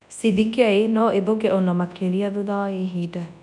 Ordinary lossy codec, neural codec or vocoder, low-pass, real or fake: none; codec, 24 kHz, 0.9 kbps, WavTokenizer, large speech release; 10.8 kHz; fake